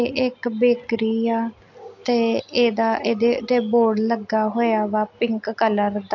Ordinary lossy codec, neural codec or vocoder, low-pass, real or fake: AAC, 48 kbps; none; 7.2 kHz; real